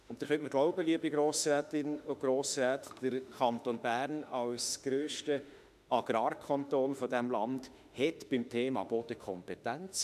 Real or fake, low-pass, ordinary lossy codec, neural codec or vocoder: fake; 14.4 kHz; none; autoencoder, 48 kHz, 32 numbers a frame, DAC-VAE, trained on Japanese speech